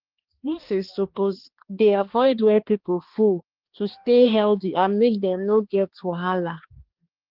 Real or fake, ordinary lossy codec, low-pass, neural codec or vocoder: fake; Opus, 16 kbps; 5.4 kHz; codec, 16 kHz, 2 kbps, X-Codec, HuBERT features, trained on balanced general audio